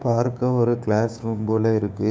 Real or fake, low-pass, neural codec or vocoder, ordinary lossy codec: fake; none; codec, 16 kHz, 6 kbps, DAC; none